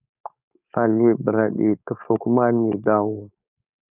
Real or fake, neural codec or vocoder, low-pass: fake; codec, 16 kHz, 4.8 kbps, FACodec; 3.6 kHz